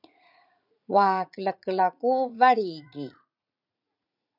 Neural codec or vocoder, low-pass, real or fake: vocoder, 44.1 kHz, 80 mel bands, Vocos; 5.4 kHz; fake